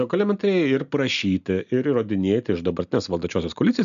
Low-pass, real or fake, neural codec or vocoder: 7.2 kHz; real; none